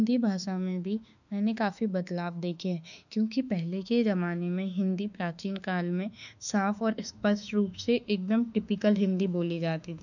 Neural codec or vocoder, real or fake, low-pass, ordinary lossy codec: autoencoder, 48 kHz, 32 numbers a frame, DAC-VAE, trained on Japanese speech; fake; 7.2 kHz; none